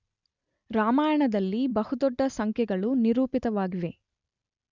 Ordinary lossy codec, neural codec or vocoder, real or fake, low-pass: none; none; real; 7.2 kHz